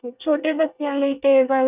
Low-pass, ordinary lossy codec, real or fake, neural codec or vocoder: 3.6 kHz; none; fake; codec, 24 kHz, 1 kbps, SNAC